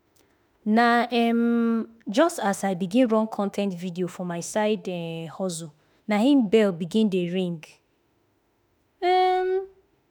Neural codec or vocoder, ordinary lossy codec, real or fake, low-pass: autoencoder, 48 kHz, 32 numbers a frame, DAC-VAE, trained on Japanese speech; none; fake; none